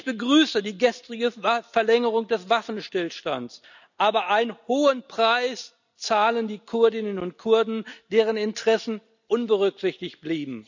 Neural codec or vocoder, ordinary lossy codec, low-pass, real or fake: none; none; 7.2 kHz; real